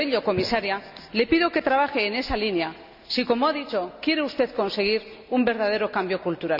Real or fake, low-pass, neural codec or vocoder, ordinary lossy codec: real; 5.4 kHz; none; none